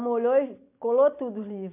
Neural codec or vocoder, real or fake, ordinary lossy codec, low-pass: none; real; MP3, 32 kbps; 3.6 kHz